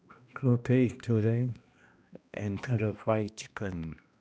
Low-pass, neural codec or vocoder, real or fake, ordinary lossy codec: none; codec, 16 kHz, 1 kbps, X-Codec, HuBERT features, trained on balanced general audio; fake; none